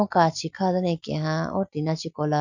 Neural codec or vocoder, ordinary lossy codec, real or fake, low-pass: none; none; real; 7.2 kHz